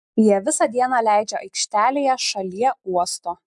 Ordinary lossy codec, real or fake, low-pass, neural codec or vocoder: MP3, 96 kbps; real; 10.8 kHz; none